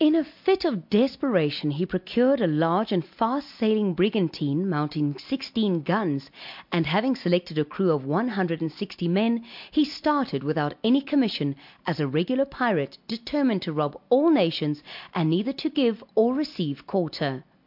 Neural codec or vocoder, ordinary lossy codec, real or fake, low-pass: none; MP3, 48 kbps; real; 5.4 kHz